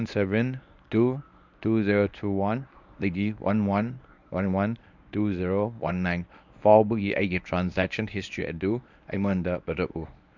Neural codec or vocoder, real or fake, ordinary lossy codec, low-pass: codec, 24 kHz, 0.9 kbps, WavTokenizer, medium speech release version 1; fake; none; 7.2 kHz